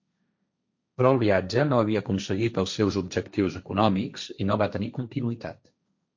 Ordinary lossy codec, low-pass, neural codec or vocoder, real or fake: MP3, 48 kbps; 7.2 kHz; codec, 16 kHz, 1.1 kbps, Voila-Tokenizer; fake